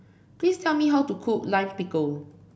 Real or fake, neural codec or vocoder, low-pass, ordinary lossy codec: real; none; none; none